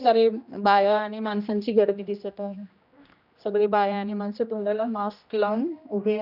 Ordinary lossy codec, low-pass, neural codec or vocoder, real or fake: none; 5.4 kHz; codec, 16 kHz, 1 kbps, X-Codec, HuBERT features, trained on general audio; fake